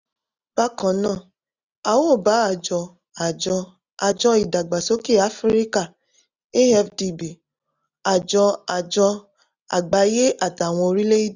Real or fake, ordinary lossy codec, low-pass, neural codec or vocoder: real; none; 7.2 kHz; none